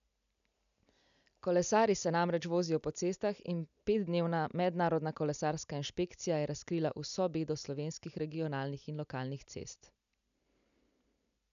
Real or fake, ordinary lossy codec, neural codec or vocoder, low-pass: real; none; none; 7.2 kHz